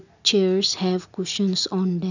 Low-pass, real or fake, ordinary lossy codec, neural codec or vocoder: 7.2 kHz; real; none; none